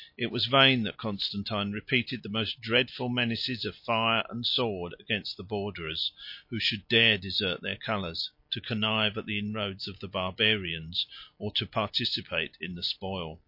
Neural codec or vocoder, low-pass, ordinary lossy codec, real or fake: none; 5.4 kHz; MP3, 32 kbps; real